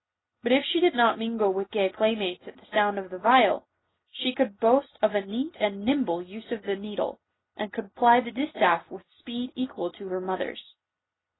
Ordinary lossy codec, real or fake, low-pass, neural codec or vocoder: AAC, 16 kbps; real; 7.2 kHz; none